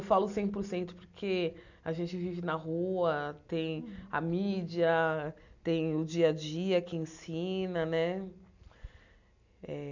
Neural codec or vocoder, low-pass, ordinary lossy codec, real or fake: none; 7.2 kHz; none; real